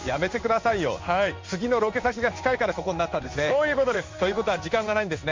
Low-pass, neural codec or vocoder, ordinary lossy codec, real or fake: 7.2 kHz; codec, 16 kHz in and 24 kHz out, 1 kbps, XY-Tokenizer; MP3, 48 kbps; fake